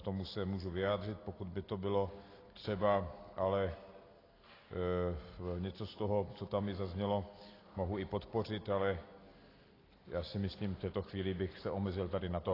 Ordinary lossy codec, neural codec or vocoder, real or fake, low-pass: AAC, 24 kbps; none; real; 5.4 kHz